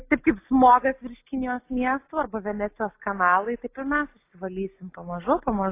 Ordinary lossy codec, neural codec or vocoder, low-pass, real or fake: AAC, 24 kbps; none; 3.6 kHz; real